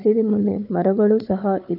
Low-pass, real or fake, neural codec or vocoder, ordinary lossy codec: 5.4 kHz; fake; codec, 16 kHz, 4 kbps, FunCodec, trained on Chinese and English, 50 frames a second; none